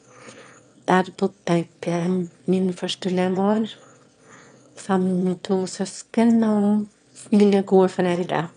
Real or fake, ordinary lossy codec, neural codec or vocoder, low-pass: fake; none; autoencoder, 22.05 kHz, a latent of 192 numbers a frame, VITS, trained on one speaker; 9.9 kHz